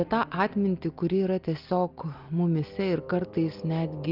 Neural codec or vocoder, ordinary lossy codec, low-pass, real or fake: none; Opus, 24 kbps; 5.4 kHz; real